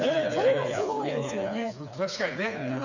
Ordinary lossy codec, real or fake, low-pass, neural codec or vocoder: none; fake; 7.2 kHz; codec, 16 kHz, 4 kbps, FreqCodec, smaller model